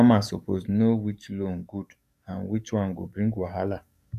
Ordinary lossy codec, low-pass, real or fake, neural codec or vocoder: none; 14.4 kHz; fake; codec, 44.1 kHz, 7.8 kbps, DAC